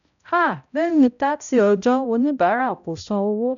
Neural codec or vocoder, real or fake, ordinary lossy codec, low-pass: codec, 16 kHz, 0.5 kbps, X-Codec, HuBERT features, trained on balanced general audio; fake; none; 7.2 kHz